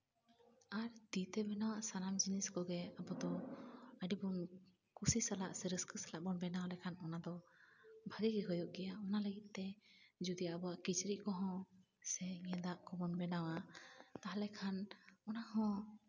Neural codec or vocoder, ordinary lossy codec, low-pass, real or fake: none; none; 7.2 kHz; real